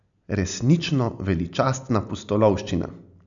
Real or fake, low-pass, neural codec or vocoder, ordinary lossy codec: real; 7.2 kHz; none; none